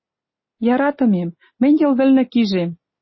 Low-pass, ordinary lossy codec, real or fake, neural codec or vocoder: 7.2 kHz; MP3, 24 kbps; real; none